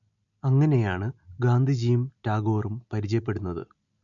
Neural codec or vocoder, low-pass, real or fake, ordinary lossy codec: none; 7.2 kHz; real; none